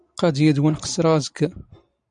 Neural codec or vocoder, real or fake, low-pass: none; real; 9.9 kHz